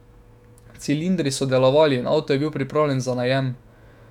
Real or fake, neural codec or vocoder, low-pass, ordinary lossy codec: fake; autoencoder, 48 kHz, 128 numbers a frame, DAC-VAE, trained on Japanese speech; 19.8 kHz; none